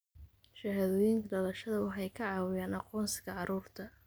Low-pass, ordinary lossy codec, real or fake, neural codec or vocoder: none; none; real; none